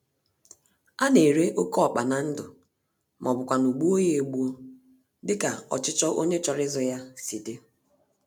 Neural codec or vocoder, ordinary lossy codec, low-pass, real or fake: none; none; none; real